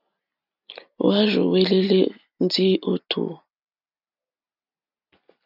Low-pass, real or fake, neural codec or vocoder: 5.4 kHz; real; none